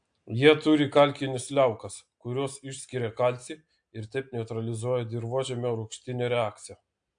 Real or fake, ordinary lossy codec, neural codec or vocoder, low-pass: real; AAC, 64 kbps; none; 9.9 kHz